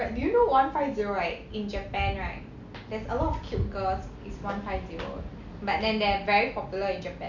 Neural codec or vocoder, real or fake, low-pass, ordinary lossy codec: none; real; 7.2 kHz; none